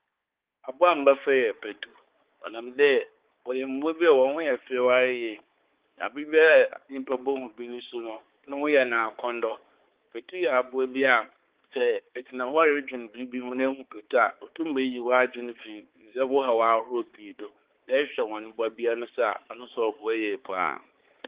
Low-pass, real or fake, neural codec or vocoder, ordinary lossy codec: 3.6 kHz; fake; codec, 16 kHz, 4 kbps, X-Codec, HuBERT features, trained on balanced general audio; Opus, 16 kbps